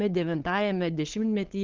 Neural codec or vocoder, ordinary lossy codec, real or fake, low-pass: codec, 16 kHz, 8 kbps, FunCodec, trained on LibriTTS, 25 frames a second; Opus, 16 kbps; fake; 7.2 kHz